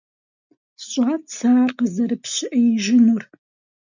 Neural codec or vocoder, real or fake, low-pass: none; real; 7.2 kHz